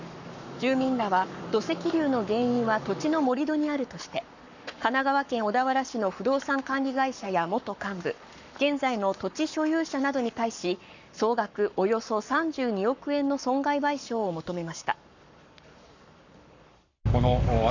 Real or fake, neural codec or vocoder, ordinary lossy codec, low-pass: fake; codec, 44.1 kHz, 7.8 kbps, Pupu-Codec; none; 7.2 kHz